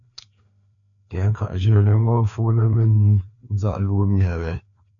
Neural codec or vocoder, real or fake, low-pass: codec, 16 kHz, 2 kbps, FreqCodec, larger model; fake; 7.2 kHz